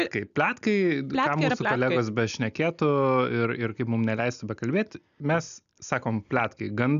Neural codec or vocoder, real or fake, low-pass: none; real; 7.2 kHz